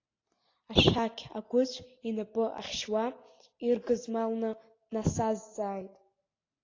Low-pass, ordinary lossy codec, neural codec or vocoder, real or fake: 7.2 kHz; AAC, 32 kbps; none; real